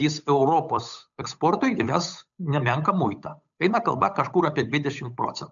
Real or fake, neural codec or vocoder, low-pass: fake; codec, 16 kHz, 8 kbps, FunCodec, trained on Chinese and English, 25 frames a second; 7.2 kHz